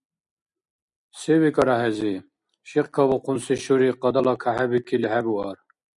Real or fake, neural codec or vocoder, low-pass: real; none; 10.8 kHz